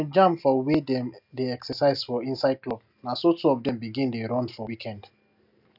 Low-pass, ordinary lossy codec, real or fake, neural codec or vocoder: 5.4 kHz; none; real; none